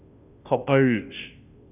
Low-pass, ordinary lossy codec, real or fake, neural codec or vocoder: 3.6 kHz; none; fake; codec, 16 kHz, 0.5 kbps, FunCodec, trained on Chinese and English, 25 frames a second